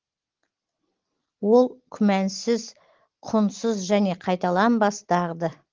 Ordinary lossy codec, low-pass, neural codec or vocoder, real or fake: Opus, 16 kbps; 7.2 kHz; none; real